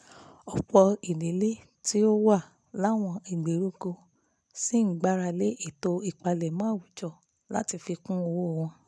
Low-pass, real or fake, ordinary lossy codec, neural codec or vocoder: none; real; none; none